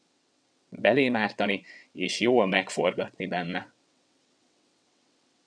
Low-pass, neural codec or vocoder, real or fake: 9.9 kHz; vocoder, 22.05 kHz, 80 mel bands, WaveNeXt; fake